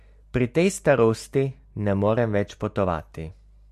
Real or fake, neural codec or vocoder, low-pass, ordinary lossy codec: real; none; 14.4 kHz; MP3, 64 kbps